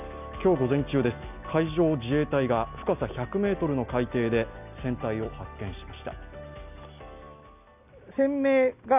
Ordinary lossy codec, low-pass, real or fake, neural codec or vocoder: none; 3.6 kHz; real; none